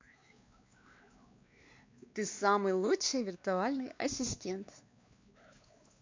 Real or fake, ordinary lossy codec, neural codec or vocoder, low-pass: fake; MP3, 64 kbps; codec, 16 kHz, 2 kbps, X-Codec, WavLM features, trained on Multilingual LibriSpeech; 7.2 kHz